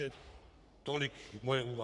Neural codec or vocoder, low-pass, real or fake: codec, 44.1 kHz, 3.4 kbps, Pupu-Codec; 10.8 kHz; fake